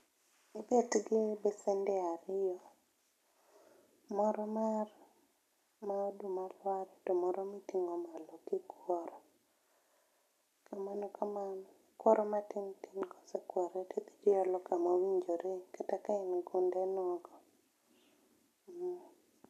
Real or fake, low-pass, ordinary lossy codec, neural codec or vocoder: real; 14.4 kHz; none; none